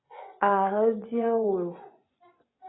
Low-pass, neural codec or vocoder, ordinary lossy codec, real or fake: 7.2 kHz; vocoder, 24 kHz, 100 mel bands, Vocos; AAC, 16 kbps; fake